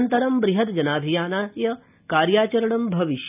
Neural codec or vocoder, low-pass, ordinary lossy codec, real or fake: none; 3.6 kHz; none; real